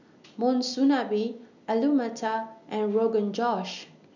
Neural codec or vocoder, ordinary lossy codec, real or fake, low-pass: none; none; real; 7.2 kHz